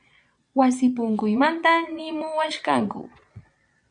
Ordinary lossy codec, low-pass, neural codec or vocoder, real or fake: MP3, 64 kbps; 9.9 kHz; vocoder, 22.05 kHz, 80 mel bands, Vocos; fake